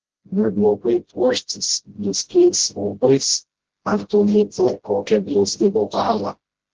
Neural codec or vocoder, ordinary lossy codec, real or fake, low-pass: codec, 16 kHz, 0.5 kbps, FreqCodec, smaller model; Opus, 16 kbps; fake; 7.2 kHz